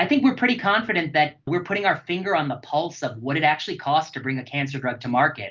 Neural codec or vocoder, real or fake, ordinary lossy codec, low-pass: none; real; Opus, 32 kbps; 7.2 kHz